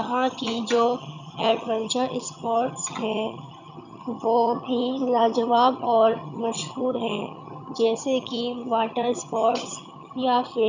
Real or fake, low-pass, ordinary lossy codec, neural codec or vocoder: fake; 7.2 kHz; none; vocoder, 22.05 kHz, 80 mel bands, HiFi-GAN